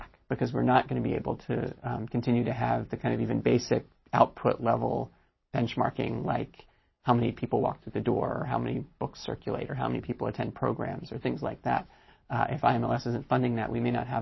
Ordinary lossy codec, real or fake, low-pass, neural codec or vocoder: MP3, 24 kbps; real; 7.2 kHz; none